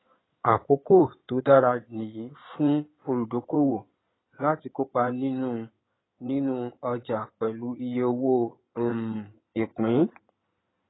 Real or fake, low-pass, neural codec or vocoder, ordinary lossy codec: fake; 7.2 kHz; codec, 16 kHz in and 24 kHz out, 2.2 kbps, FireRedTTS-2 codec; AAC, 16 kbps